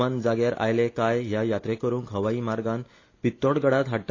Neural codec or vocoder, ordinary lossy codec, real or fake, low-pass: none; AAC, 32 kbps; real; 7.2 kHz